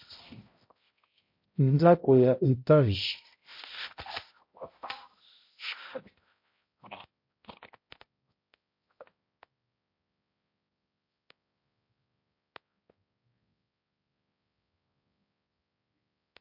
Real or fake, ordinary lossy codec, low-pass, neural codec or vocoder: fake; MP3, 32 kbps; 5.4 kHz; codec, 16 kHz, 0.5 kbps, X-Codec, HuBERT features, trained on balanced general audio